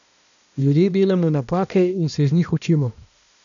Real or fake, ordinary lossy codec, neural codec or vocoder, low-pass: fake; none; codec, 16 kHz, 1 kbps, X-Codec, HuBERT features, trained on balanced general audio; 7.2 kHz